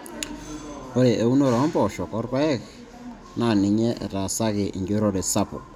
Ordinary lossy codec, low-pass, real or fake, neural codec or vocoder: none; 19.8 kHz; real; none